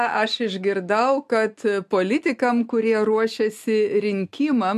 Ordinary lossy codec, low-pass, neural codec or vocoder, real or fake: MP3, 64 kbps; 14.4 kHz; none; real